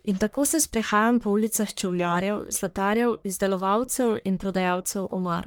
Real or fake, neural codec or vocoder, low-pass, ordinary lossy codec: fake; codec, 44.1 kHz, 1.7 kbps, Pupu-Codec; none; none